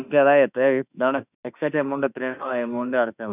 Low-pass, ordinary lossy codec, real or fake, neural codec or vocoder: 3.6 kHz; none; fake; autoencoder, 48 kHz, 32 numbers a frame, DAC-VAE, trained on Japanese speech